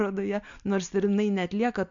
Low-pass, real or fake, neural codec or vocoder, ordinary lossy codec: 7.2 kHz; real; none; MP3, 64 kbps